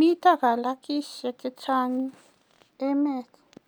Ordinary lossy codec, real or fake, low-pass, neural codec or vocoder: none; real; none; none